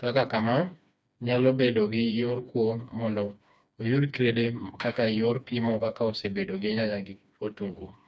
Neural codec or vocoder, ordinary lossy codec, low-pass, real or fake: codec, 16 kHz, 2 kbps, FreqCodec, smaller model; none; none; fake